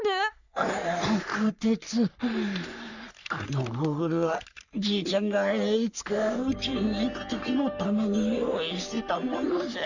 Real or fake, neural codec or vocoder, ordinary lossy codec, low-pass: fake; codec, 44.1 kHz, 3.4 kbps, Pupu-Codec; none; 7.2 kHz